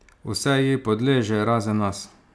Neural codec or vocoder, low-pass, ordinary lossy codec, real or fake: none; none; none; real